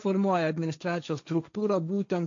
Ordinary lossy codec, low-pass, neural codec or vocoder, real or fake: MP3, 64 kbps; 7.2 kHz; codec, 16 kHz, 1.1 kbps, Voila-Tokenizer; fake